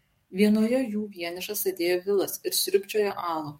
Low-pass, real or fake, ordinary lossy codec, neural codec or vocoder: 19.8 kHz; fake; MP3, 64 kbps; codec, 44.1 kHz, 7.8 kbps, DAC